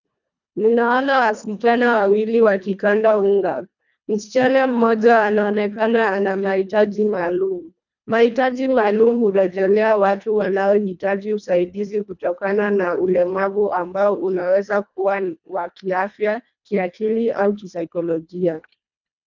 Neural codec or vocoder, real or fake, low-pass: codec, 24 kHz, 1.5 kbps, HILCodec; fake; 7.2 kHz